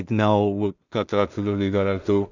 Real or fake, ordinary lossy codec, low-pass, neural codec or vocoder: fake; none; 7.2 kHz; codec, 16 kHz in and 24 kHz out, 0.4 kbps, LongCat-Audio-Codec, two codebook decoder